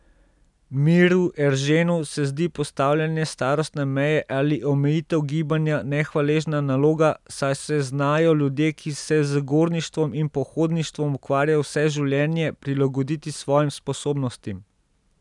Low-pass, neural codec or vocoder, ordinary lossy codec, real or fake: 10.8 kHz; none; none; real